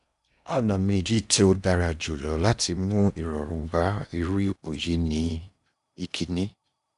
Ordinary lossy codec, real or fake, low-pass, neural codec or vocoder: none; fake; 10.8 kHz; codec, 16 kHz in and 24 kHz out, 0.8 kbps, FocalCodec, streaming, 65536 codes